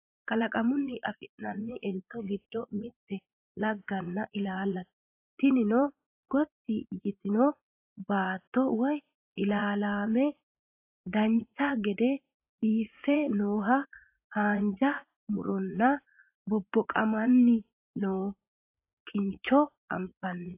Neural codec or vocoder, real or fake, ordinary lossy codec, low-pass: vocoder, 44.1 kHz, 80 mel bands, Vocos; fake; AAC, 24 kbps; 3.6 kHz